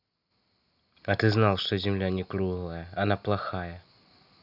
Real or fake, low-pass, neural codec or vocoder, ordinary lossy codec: real; 5.4 kHz; none; none